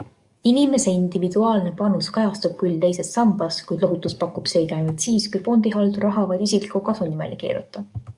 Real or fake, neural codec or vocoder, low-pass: fake; codec, 44.1 kHz, 7.8 kbps, DAC; 10.8 kHz